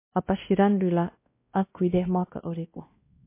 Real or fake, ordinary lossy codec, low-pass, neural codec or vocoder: fake; MP3, 24 kbps; 3.6 kHz; codec, 16 kHz, 1 kbps, X-Codec, WavLM features, trained on Multilingual LibriSpeech